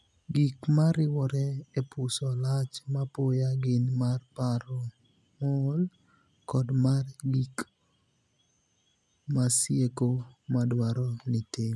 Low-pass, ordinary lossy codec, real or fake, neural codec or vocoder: none; none; real; none